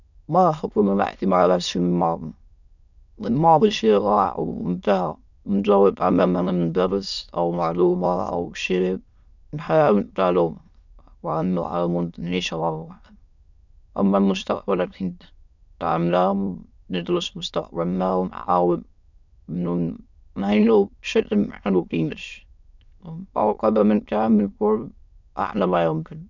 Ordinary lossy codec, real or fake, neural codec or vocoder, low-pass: none; fake; autoencoder, 22.05 kHz, a latent of 192 numbers a frame, VITS, trained on many speakers; 7.2 kHz